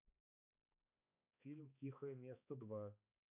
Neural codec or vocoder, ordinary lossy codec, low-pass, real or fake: codec, 16 kHz, 2 kbps, X-Codec, HuBERT features, trained on balanced general audio; none; 3.6 kHz; fake